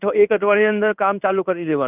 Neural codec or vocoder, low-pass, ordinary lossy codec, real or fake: codec, 16 kHz in and 24 kHz out, 1 kbps, XY-Tokenizer; 3.6 kHz; none; fake